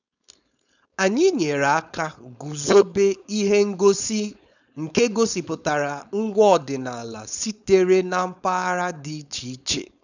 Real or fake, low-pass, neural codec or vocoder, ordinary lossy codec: fake; 7.2 kHz; codec, 16 kHz, 4.8 kbps, FACodec; none